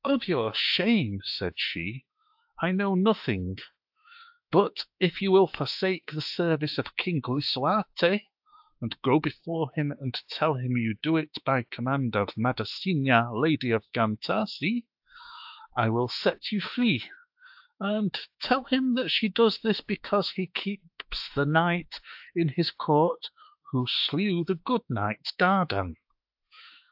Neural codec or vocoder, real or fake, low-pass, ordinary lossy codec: autoencoder, 48 kHz, 32 numbers a frame, DAC-VAE, trained on Japanese speech; fake; 5.4 kHz; AAC, 48 kbps